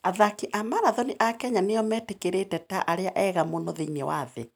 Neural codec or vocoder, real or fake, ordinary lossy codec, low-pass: none; real; none; none